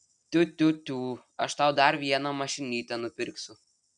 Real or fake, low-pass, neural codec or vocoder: real; 9.9 kHz; none